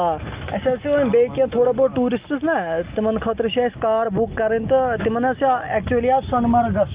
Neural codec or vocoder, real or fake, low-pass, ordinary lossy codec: none; real; 3.6 kHz; Opus, 32 kbps